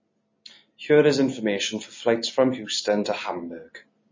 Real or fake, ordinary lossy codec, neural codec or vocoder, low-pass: real; MP3, 32 kbps; none; 7.2 kHz